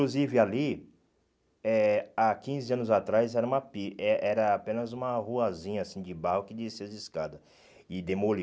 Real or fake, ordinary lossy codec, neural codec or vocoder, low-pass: real; none; none; none